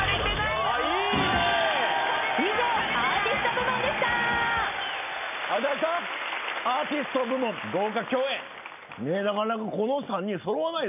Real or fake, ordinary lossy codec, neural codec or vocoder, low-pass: real; none; none; 3.6 kHz